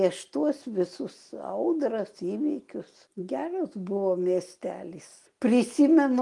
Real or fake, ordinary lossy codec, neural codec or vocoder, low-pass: real; Opus, 24 kbps; none; 10.8 kHz